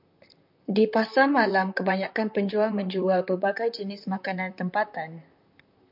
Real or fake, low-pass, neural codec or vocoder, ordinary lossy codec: fake; 5.4 kHz; vocoder, 44.1 kHz, 128 mel bands, Pupu-Vocoder; MP3, 48 kbps